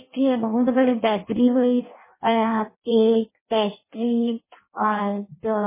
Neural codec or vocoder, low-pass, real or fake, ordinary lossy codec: codec, 16 kHz in and 24 kHz out, 0.6 kbps, FireRedTTS-2 codec; 3.6 kHz; fake; MP3, 16 kbps